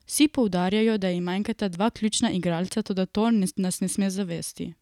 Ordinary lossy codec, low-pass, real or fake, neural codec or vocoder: none; 19.8 kHz; real; none